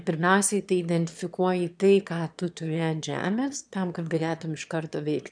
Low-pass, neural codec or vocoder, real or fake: 9.9 kHz; autoencoder, 22.05 kHz, a latent of 192 numbers a frame, VITS, trained on one speaker; fake